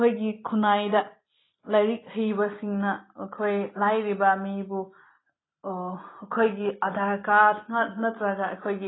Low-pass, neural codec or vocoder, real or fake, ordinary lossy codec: 7.2 kHz; none; real; AAC, 16 kbps